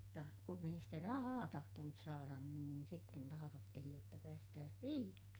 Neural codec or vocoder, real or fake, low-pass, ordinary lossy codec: codec, 44.1 kHz, 2.6 kbps, SNAC; fake; none; none